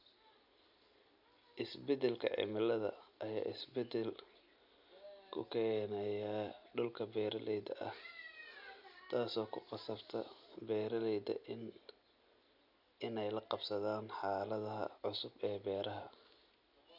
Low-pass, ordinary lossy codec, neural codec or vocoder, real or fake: 5.4 kHz; none; none; real